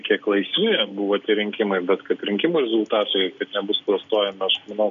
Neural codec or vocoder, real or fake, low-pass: none; real; 7.2 kHz